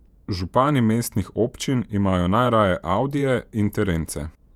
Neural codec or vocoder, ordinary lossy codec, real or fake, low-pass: vocoder, 48 kHz, 128 mel bands, Vocos; none; fake; 19.8 kHz